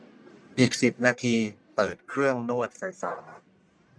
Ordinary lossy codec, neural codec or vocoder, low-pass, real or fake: none; codec, 44.1 kHz, 1.7 kbps, Pupu-Codec; 9.9 kHz; fake